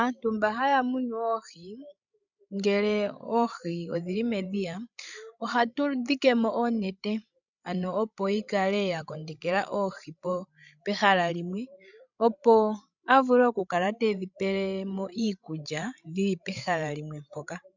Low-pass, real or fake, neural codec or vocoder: 7.2 kHz; fake; codec, 16 kHz, 16 kbps, FreqCodec, larger model